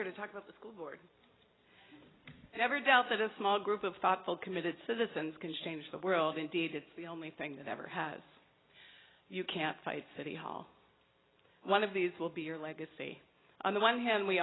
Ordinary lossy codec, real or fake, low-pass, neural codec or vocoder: AAC, 16 kbps; real; 7.2 kHz; none